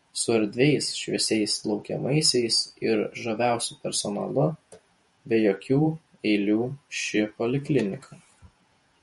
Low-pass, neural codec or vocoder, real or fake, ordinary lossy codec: 19.8 kHz; vocoder, 48 kHz, 128 mel bands, Vocos; fake; MP3, 48 kbps